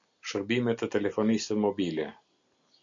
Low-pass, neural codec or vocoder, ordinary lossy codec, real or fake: 7.2 kHz; none; AAC, 64 kbps; real